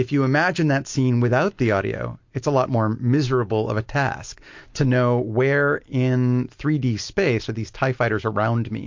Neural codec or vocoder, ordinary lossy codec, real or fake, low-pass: none; MP3, 48 kbps; real; 7.2 kHz